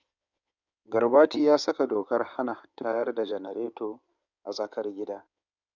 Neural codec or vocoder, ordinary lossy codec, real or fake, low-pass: codec, 16 kHz in and 24 kHz out, 2.2 kbps, FireRedTTS-2 codec; none; fake; 7.2 kHz